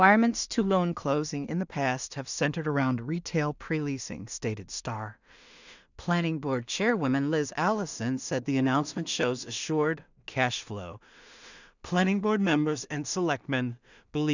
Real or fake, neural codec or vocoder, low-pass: fake; codec, 16 kHz in and 24 kHz out, 0.4 kbps, LongCat-Audio-Codec, two codebook decoder; 7.2 kHz